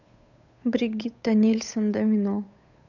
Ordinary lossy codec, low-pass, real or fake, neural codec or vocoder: none; 7.2 kHz; fake; codec, 16 kHz, 8 kbps, FunCodec, trained on Chinese and English, 25 frames a second